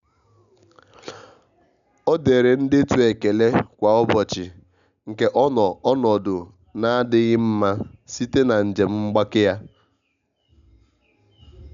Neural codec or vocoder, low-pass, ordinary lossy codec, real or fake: none; 7.2 kHz; none; real